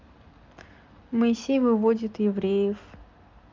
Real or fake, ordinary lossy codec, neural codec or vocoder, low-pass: real; Opus, 24 kbps; none; 7.2 kHz